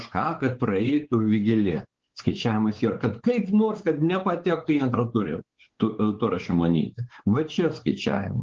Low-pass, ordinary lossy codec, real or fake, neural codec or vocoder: 7.2 kHz; Opus, 16 kbps; fake; codec, 16 kHz, 4 kbps, X-Codec, WavLM features, trained on Multilingual LibriSpeech